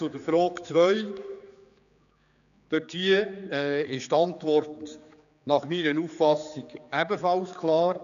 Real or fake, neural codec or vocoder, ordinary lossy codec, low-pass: fake; codec, 16 kHz, 4 kbps, X-Codec, HuBERT features, trained on general audio; none; 7.2 kHz